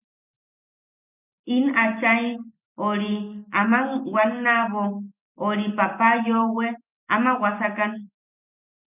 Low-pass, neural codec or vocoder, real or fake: 3.6 kHz; none; real